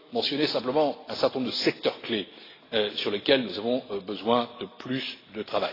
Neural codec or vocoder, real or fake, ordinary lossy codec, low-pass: none; real; AAC, 24 kbps; 5.4 kHz